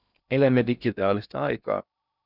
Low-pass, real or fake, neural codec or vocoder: 5.4 kHz; fake; codec, 16 kHz in and 24 kHz out, 0.6 kbps, FocalCodec, streaming, 2048 codes